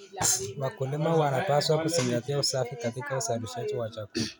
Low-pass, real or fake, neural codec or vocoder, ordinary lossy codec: none; real; none; none